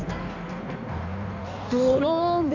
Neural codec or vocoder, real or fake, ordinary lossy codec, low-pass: codec, 16 kHz in and 24 kHz out, 1.1 kbps, FireRedTTS-2 codec; fake; none; 7.2 kHz